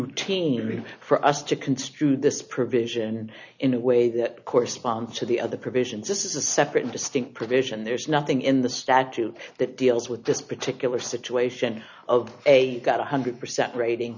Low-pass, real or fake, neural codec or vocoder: 7.2 kHz; real; none